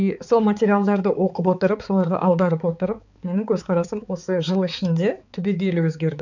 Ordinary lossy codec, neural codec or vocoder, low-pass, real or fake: none; codec, 16 kHz, 4 kbps, X-Codec, HuBERT features, trained on balanced general audio; 7.2 kHz; fake